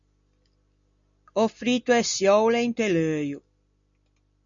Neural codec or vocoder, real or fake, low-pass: none; real; 7.2 kHz